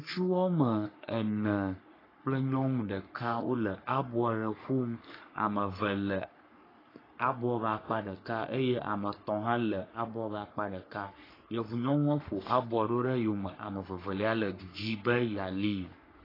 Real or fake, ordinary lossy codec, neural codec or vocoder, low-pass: fake; AAC, 24 kbps; codec, 44.1 kHz, 3.4 kbps, Pupu-Codec; 5.4 kHz